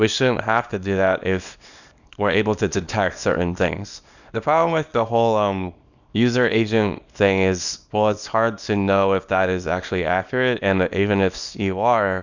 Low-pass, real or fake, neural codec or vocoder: 7.2 kHz; fake; codec, 24 kHz, 0.9 kbps, WavTokenizer, small release